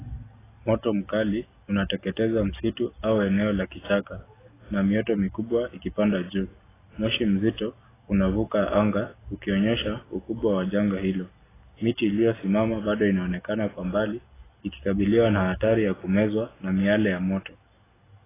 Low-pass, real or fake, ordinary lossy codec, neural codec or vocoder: 3.6 kHz; real; AAC, 16 kbps; none